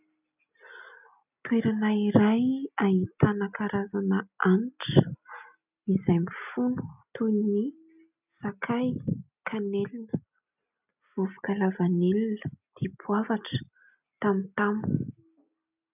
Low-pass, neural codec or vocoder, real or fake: 3.6 kHz; none; real